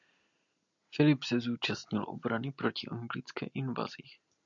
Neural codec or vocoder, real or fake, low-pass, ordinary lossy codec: none; real; 7.2 kHz; MP3, 96 kbps